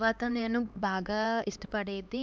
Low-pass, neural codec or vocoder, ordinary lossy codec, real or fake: none; codec, 16 kHz, 2 kbps, X-Codec, HuBERT features, trained on LibriSpeech; none; fake